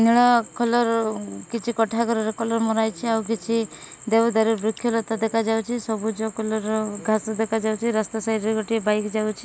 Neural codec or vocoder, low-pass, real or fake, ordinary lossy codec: none; none; real; none